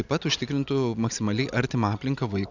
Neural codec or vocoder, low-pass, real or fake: none; 7.2 kHz; real